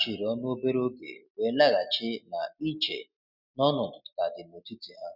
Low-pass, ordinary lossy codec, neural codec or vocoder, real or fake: 5.4 kHz; none; none; real